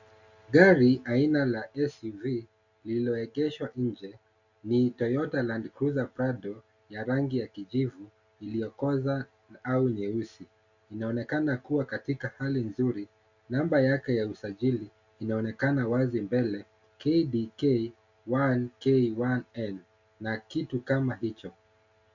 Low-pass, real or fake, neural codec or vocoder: 7.2 kHz; real; none